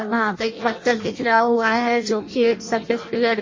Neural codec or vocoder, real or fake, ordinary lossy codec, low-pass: codec, 16 kHz in and 24 kHz out, 0.6 kbps, FireRedTTS-2 codec; fake; MP3, 32 kbps; 7.2 kHz